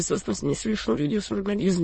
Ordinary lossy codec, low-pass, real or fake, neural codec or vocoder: MP3, 32 kbps; 9.9 kHz; fake; autoencoder, 22.05 kHz, a latent of 192 numbers a frame, VITS, trained on many speakers